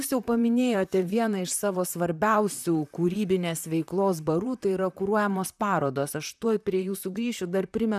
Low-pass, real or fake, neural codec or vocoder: 14.4 kHz; fake; vocoder, 44.1 kHz, 128 mel bands, Pupu-Vocoder